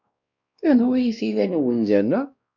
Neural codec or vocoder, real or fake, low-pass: codec, 16 kHz, 1 kbps, X-Codec, WavLM features, trained on Multilingual LibriSpeech; fake; 7.2 kHz